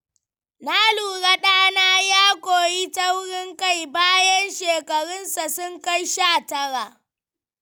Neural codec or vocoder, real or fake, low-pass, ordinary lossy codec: none; real; none; none